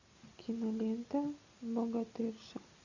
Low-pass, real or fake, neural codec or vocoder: 7.2 kHz; real; none